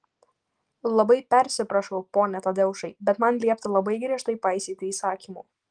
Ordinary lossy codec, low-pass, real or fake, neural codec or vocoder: Opus, 24 kbps; 9.9 kHz; real; none